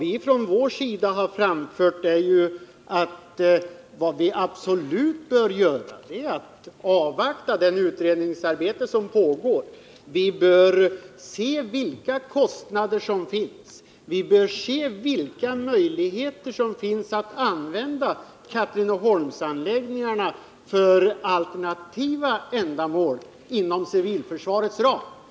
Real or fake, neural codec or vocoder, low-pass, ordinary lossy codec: real; none; none; none